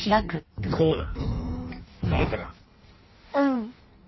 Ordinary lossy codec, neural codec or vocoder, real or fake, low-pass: MP3, 24 kbps; codec, 16 kHz in and 24 kHz out, 1.1 kbps, FireRedTTS-2 codec; fake; 7.2 kHz